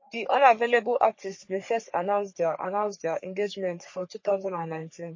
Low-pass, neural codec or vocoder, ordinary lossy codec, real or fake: 7.2 kHz; codec, 44.1 kHz, 3.4 kbps, Pupu-Codec; MP3, 32 kbps; fake